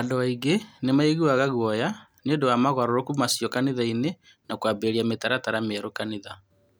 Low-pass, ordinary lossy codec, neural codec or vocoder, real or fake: none; none; none; real